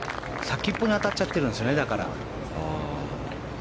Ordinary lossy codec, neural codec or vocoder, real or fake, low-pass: none; none; real; none